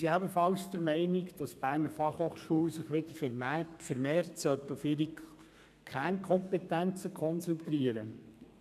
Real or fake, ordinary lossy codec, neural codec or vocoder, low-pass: fake; none; codec, 32 kHz, 1.9 kbps, SNAC; 14.4 kHz